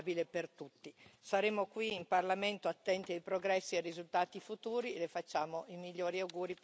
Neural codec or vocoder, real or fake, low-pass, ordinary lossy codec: none; real; none; none